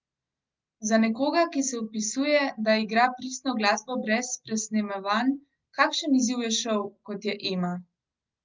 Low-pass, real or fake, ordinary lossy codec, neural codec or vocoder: 7.2 kHz; real; Opus, 24 kbps; none